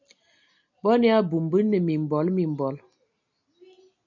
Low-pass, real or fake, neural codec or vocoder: 7.2 kHz; real; none